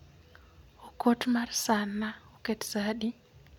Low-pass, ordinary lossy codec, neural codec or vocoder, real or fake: 19.8 kHz; none; none; real